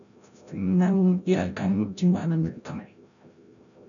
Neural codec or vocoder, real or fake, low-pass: codec, 16 kHz, 0.5 kbps, FreqCodec, larger model; fake; 7.2 kHz